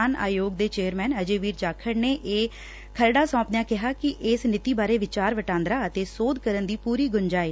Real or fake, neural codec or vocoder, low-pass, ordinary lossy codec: real; none; none; none